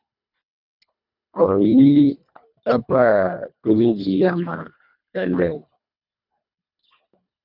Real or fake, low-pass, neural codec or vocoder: fake; 5.4 kHz; codec, 24 kHz, 1.5 kbps, HILCodec